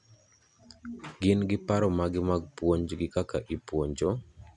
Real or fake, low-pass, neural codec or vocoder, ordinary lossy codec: real; 10.8 kHz; none; none